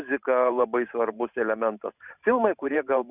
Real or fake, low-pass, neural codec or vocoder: fake; 3.6 kHz; vocoder, 44.1 kHz, 128 mel bands every 512 samples, BigVGAN v2